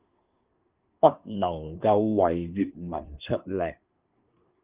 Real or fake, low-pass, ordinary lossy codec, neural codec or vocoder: fake; 3.6 kHz; Opus, 32 kbps; codec, 24 kHz, 1 kbps, SNAC